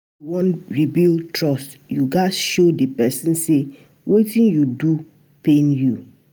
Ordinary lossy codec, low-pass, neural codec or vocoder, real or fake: none; none; none; real